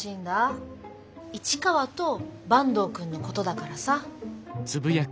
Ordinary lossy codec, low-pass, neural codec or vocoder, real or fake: none; none; none; real